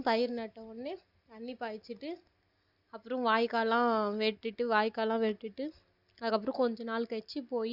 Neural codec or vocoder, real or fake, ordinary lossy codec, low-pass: none; real; Opus, 64 kbps; 5.4 kHz